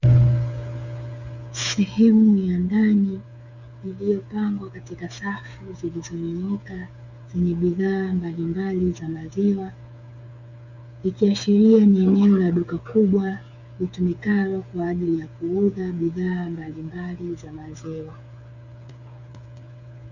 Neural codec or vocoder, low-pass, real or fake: codec, 16 kHz, 8 kbps, FreqCodec, smaller model; 7.2 kHz; fake